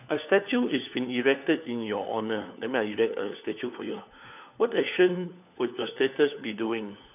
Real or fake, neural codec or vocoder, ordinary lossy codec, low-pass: fake; codec, 16 kHz, 4 kbps, FunCodec, trained on LibriTTS, 50 frames a second; none; 3.6 kHz